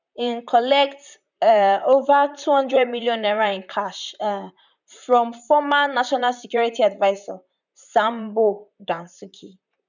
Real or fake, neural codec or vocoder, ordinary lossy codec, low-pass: fake; vocoder, 44.1 kHz, 128 mel bands, Pupu-Vocoder; none; 7.2 kHz